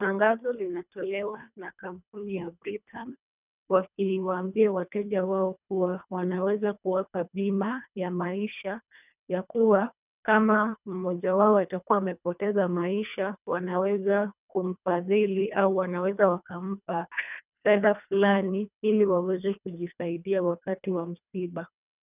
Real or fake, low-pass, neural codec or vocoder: fake; 3.6 kHz; codec, 24 kHz, 1.5 kbps, HILCodec